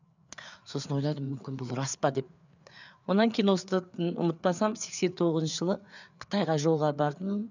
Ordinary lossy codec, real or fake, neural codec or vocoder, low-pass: none; fake; codec, 16 kHz, 4 kbps, FreqCodec, larger model; 7.2 kHz